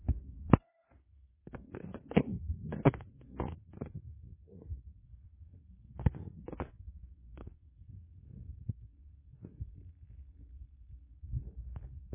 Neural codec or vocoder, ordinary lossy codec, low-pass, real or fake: codec, 16 kHz in and 24 kHz out, 0.9 kbps, LongCat-Audio-Codec, fine tuned four codebook decoder; MP3, 16 kbps; 3.6 kHz; fake